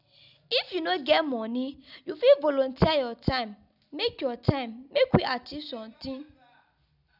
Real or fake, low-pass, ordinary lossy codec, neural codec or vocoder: real; 5.4 kHz; none; none